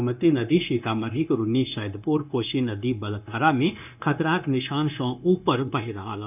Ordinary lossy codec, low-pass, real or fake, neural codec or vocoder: none; 3.6 kHz; fake; codec, 16 kHz, 0.9 kbps, LongCat-Audio-Codec